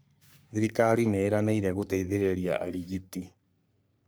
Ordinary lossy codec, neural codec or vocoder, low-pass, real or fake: none; codec, 44.1 kHz, 3.4 kbps, Pupu-Codec; none; fake